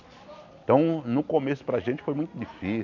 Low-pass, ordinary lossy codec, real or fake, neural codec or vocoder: 7.2 kHz; none; real; none